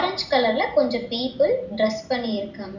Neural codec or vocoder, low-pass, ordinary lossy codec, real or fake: none; 7.2 kHz; none; real